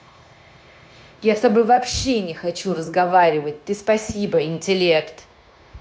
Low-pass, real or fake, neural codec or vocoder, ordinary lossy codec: none; fake; codec, 16 kHz, 0.9 kbps, LongCat-Audio-Codec; none